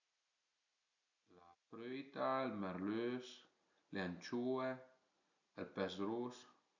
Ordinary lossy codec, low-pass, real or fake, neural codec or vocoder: none; 7.2 kHz; real; none